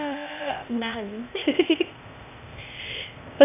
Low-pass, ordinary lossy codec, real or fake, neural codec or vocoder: 3.6 kHz; none; fake; codec, 16 kHz, 0.8 kbps, ZipCodec